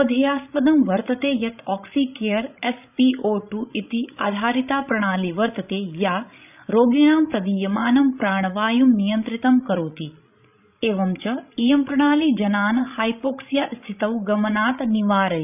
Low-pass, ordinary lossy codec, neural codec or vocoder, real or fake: 3.6 kHz; none; codec, 16 kHz, 16 kbps, FreqCodec, larger model; fake